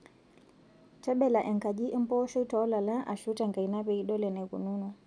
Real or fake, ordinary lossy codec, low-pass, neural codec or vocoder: real; none; 9.9 kHz; none